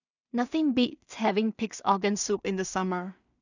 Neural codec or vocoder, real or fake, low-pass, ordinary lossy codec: codec, 16 kHz in and 24 kHz out, 0.4 kbps, LongCat-Audio-Codec, two codebook decoder; fake; 7.2 kHz; none